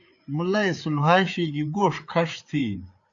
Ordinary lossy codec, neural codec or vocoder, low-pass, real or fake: AAC, 64 kbps; codec, 16 kHz, 4 kbps, FreqCodec, larger model; 7.2 kHz; fake